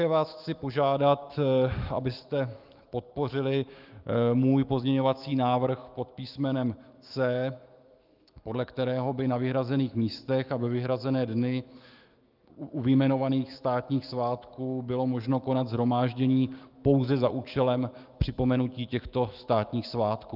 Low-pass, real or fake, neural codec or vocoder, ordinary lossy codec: 5.4 kHz; fake; autoencoder, 48 kHz, 128 numbers a frame, DAC-VAE, trained on Japanese speech; Opus, 32 kbps